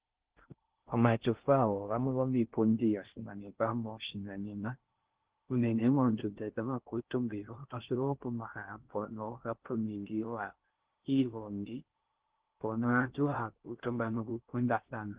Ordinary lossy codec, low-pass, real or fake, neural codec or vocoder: Opus, 16 kbps; 3.6 kHz; fake; codec, 16 kHz in and 24 kHz out, 0.6 kbps, FocalCodec, streaming, 4096 codes